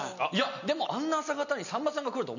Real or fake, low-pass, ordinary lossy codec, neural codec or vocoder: real; 7.2 kHz; none; none